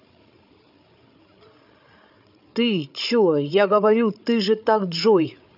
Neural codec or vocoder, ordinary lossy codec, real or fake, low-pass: codec, 16 kHz, 8 kbps, FreqCodec, larger model; none; fake; 5.4 kHz